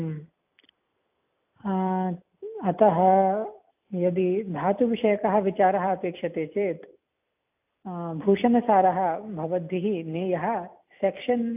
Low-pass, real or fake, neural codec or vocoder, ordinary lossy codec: 3.6 kHz; real; none; AAC, 32 kbps